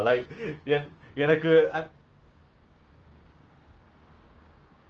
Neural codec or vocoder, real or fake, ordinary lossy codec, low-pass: codec, 16 kHz, 6 kbps, DAC; fake; Opus, 24 kbps; 7.2 kHz